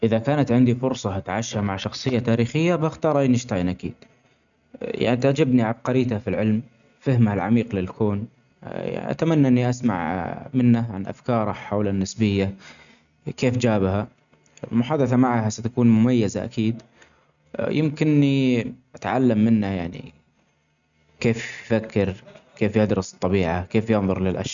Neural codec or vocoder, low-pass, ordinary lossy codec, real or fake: none; 7.2 kHz; none; real